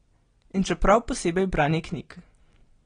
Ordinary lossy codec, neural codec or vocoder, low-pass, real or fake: AAC, 32 kbps; none; 9.9 kHz; real